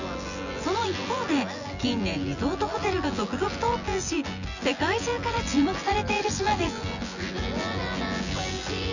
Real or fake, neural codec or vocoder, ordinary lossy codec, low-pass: fake; vocoder, 24 kHz, 100 mel bands, Vocos; none; 7.2 kHz